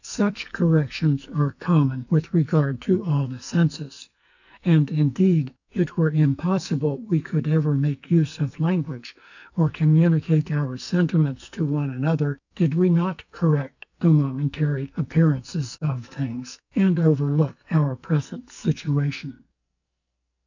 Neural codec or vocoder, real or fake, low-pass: codec, 44.1 kHz, 2.6 kbps, SNAC; fake; 7.2 kHz